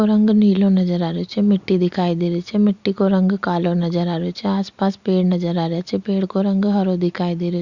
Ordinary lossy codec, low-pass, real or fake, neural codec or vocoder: Opus, 64 kbps; 7.2 kHz; real; none